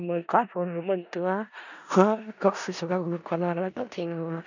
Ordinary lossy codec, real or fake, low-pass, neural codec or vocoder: none; fake; 7.2 kHz; codec, 16 kHz in and 24 kHz out, 0.4 kbps, LongCat-Audio-Codec, four codebook decoder